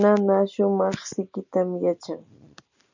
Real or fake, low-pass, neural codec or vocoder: real; 7.2 kHz; none